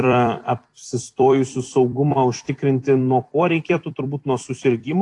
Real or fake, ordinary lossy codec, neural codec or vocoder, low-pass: fake; AAC, 48 kbps; vocoder, 44.1 kHz, 128 mel bands every 256 samples, BigVGAN v2; 10.8 kHz